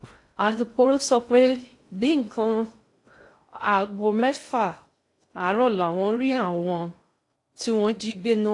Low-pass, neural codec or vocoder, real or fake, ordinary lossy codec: 10.8 kHz; codec, 16 kHz in and 24 kHz out, 0.6 kbps, FocalCodec, streaming, 2048 codes; fake; AAC, 48 kbps